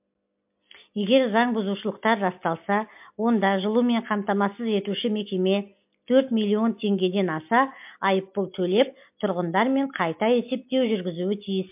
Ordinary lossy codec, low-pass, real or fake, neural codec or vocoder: MP3, 32 kbps; 3.6 kHz; real; none